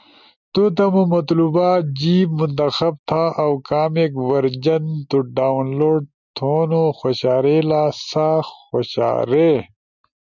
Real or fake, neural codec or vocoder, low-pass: real; none; 7.2 kHz